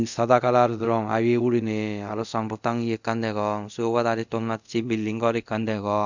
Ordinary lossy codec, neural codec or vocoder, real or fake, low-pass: none; codec, 24 kHz, 0.5 kbps, DualCodec; fake; 7.2 kHz